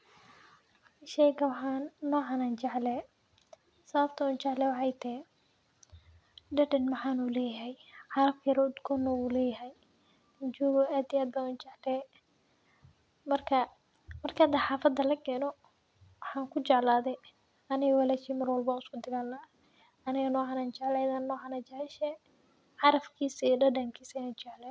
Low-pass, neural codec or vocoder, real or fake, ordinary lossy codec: none; none; real; none